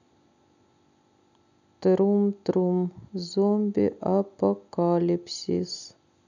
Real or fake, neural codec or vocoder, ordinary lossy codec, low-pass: real; none; none; 7.2 kHz